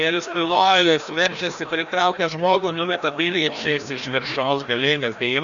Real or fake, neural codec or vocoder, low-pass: fake; codec, 16 kHz, 1 kbps, FreqCodec, larger model; 7.2 kHz